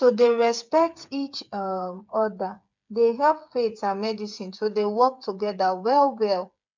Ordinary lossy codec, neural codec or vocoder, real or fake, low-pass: MP3, 64 kbps; codec, 16 kHz, 8 kbps, FreqCodec, smaller model; fake; 7.2 kHz